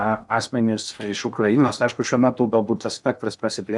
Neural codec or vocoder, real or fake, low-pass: codec, 16 kHz in and 24 kHz out, 0.8 kbps, FocalCodec, streaming, 65536 codes; fake; 10.8 kHz